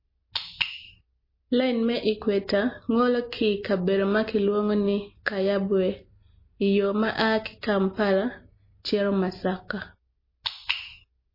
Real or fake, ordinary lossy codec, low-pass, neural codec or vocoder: real; MP3, 24 kbps; 5.4 kHz; none